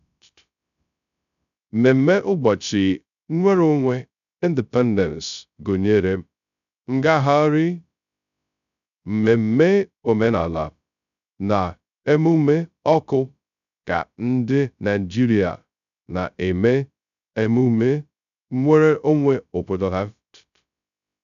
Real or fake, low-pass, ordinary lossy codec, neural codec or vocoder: fake; 7.2 kHz; none; codec, 16 kHz, 0.2 kbps, FocalCodec